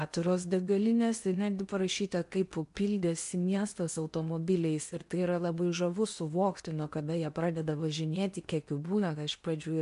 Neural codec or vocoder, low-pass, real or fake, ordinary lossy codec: codec, 16 kHz in and 24 kHz out, 0.8 kbps, FocalCodec, streaming, 65536 codes; 10.8 kHz; fake; MP3, 64 kbps